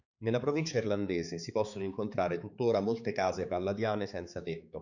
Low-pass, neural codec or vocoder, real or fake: 7.2 kHz; codec, 16 kHz, 4 kbps, X-Codec, HuBERT features, trained on balanced general audio; fake